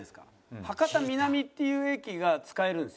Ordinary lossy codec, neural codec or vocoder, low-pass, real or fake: none; none; none; real